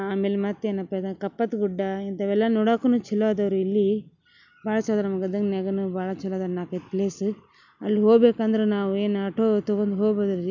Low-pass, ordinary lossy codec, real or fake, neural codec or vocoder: 7.2 kHz; none; real; none